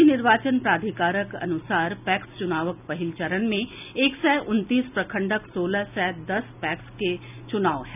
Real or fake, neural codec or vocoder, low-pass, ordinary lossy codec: real; none; 3.6 kHz; none